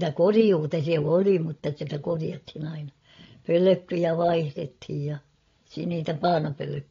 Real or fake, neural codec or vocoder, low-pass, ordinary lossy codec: fake; codec, 16 kHz, 16 kbps, FreqCodec, larger model; 7.2 kHz; AAC, 32 kbps